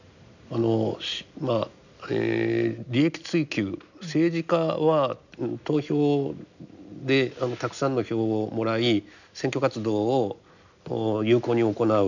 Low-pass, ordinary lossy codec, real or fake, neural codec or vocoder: 7.2 kHz; none; real; none